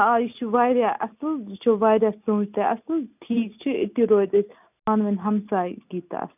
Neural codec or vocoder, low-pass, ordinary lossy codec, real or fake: none; 3.6 kHz; none; real